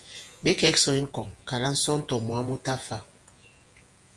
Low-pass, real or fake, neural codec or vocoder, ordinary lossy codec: 10.8 kHz; fake; vocoder, 48 kHz, 128 mel bands, Vocos; Opus, 32 kbps